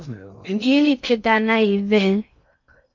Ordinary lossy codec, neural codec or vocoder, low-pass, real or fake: MP3, 64 kbps; codec, 16 kHz in and 24 kHz out, 0.6 kbps, FocalCodec, streaming, 2048 codes; 7.2 kHz; fake